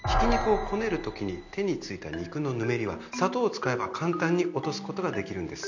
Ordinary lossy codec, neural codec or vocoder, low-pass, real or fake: none; none; 7.2 kHz; real